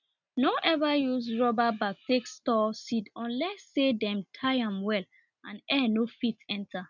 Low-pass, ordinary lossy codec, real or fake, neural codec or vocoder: 7.2 kHz; none; real; none